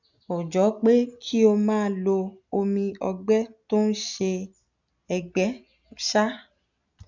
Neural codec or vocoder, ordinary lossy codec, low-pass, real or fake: none; none; 7.2 kHz; real